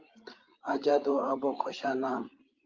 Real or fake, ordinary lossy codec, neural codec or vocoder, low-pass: fake; Opus, 32 kbps; codec, 16 kHz, 8 kbps, FreqCodec, larger model; 7.2 kHz